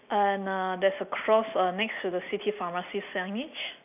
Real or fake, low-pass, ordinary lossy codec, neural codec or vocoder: real; 3.6 kHz; none; none